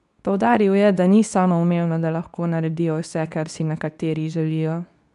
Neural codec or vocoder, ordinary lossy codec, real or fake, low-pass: codec, 24 kHz, 0.9 kbps, WavTokenizer, medium speech release version 2; none; fake; 10.8 kHz